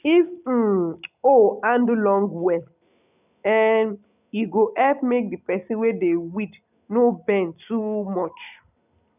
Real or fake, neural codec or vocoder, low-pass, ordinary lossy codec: real; none; 3.6 kHz; none